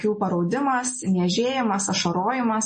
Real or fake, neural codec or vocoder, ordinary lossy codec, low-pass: real; none; MP3, 32 kbps; 10.8 kHz